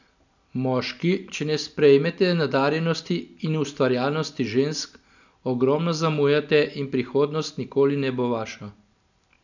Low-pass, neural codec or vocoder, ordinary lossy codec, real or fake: 7.2 kHz; none; none; real